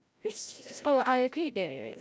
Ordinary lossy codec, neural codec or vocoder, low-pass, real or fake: none; codec, 16 kHz, 0.5 kbps, FreqCodec, larger model; none; fake